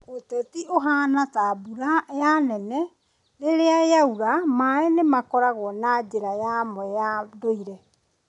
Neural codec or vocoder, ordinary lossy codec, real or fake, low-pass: none; none; real; 10.8 kHz